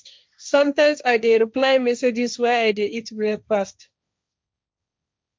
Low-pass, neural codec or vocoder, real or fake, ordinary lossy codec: none; codec, 16 kHz, 1.1 kbps, Voila-Tokenizer; fake; none